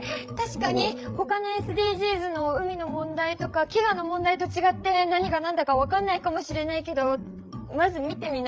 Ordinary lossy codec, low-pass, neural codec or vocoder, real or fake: none; none; codec, 16 kHz, 8 kbps, FreqCodec, larger model; fake